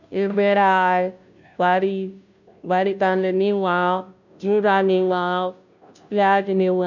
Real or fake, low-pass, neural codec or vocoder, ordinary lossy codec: fake; 7.2 kHz; codec, 16 kHz, 0.5 kbps, FunCodec, trained on Chinese and English, 25 frames a second; none